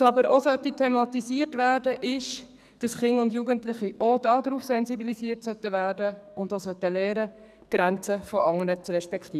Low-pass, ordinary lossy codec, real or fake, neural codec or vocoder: 14.4 kHz; none; fake; codec, 44.1 kHz, 2.6 kbps, SNAC